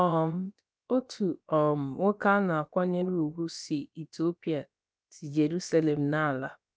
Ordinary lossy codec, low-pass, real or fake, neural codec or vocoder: none; none; fake; codec, 16 kHz, about 1 kbps, DyCAST, with the encoder's durations